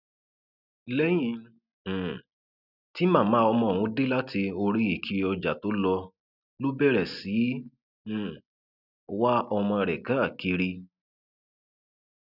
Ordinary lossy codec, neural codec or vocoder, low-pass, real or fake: none; none; 5.4 kHz; real